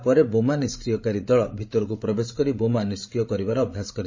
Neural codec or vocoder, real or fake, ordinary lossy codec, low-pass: codec, 16 kHz, 16 kbps, FreqCodec, larger model; fake; MP3, 32 kbps; 7.2 kHz